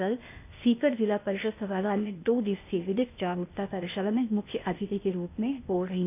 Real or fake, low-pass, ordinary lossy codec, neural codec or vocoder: fake; 3.6 kHz; none; codec, 16 kHz, 0.8 kbps, ZipCodec